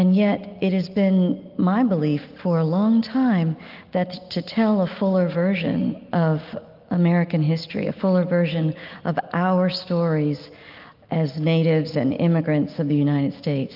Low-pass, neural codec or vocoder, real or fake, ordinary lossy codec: 5.4 kHz; none; real; Opus, 32 kbps